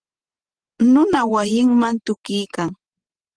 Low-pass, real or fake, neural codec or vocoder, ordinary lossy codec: 9.9 kHz; fake; vocoder, 44.1 kHz, 128 mel bands every 512 samples, BigVGAN v2; Opus, 16 kbps